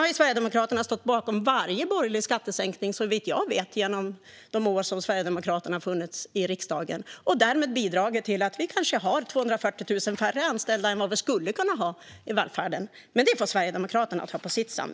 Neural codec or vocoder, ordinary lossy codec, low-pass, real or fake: none; none; none; real